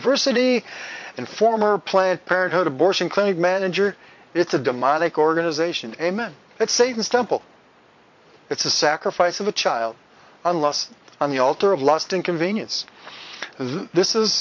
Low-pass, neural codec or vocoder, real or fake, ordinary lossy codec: 7.2 kHz; vocoder, 22.05 kHz, 80 mel bands, Vocos; fake; MP3, 48 kbps